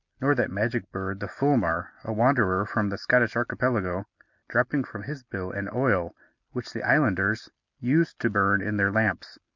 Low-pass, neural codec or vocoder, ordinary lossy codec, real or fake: 7.2 kHz; none; MP3, 64 kbps; real